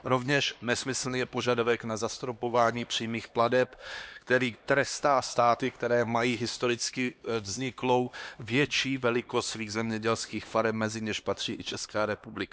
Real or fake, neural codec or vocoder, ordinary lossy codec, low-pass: fake; codec, 16 kHz, 2 kbps, X-Codec, HuBERT features, trained on LibriSpeech; none; none